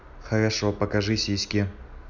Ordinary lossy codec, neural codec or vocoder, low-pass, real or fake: none; none; 7.2 kHz; real